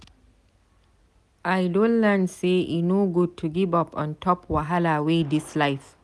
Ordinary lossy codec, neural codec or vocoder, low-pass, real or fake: none; none; none; real